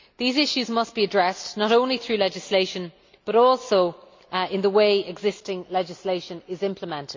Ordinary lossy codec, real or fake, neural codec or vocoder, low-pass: MP3, 48 kbps; real; none; 7.2 kHz